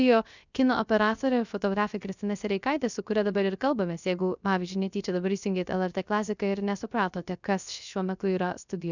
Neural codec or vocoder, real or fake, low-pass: codec, 16 kHz, 0.3 kbps, FocalCodec; fake; 7.2 kHz